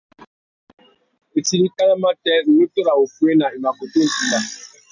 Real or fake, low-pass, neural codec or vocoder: real; 7.2 kHz; none